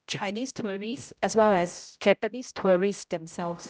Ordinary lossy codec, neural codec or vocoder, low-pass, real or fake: none; codec, 16 kHz, 0.5 kbps, X-Codec, HuBERT features, trained on general audio; none; fake